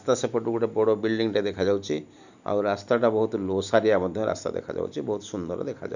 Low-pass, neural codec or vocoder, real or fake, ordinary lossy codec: 7.2 kHz; none; real; none